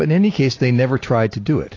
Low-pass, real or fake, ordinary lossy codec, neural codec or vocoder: 7.2 kHz; real; AAC, 32 kbps; none